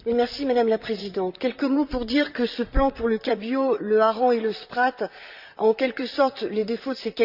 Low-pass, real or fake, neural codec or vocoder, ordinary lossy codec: 5.4 kHz; fake; vocoder, 44.1 kHz, 128 mel bands, Pupu-Vocoder; Opus, 64 kbps